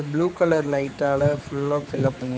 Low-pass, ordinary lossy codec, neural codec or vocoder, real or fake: none; none; codec, 16 kHz, 4 kbps, X-Codec, HuBERT features, trained on general audio; fake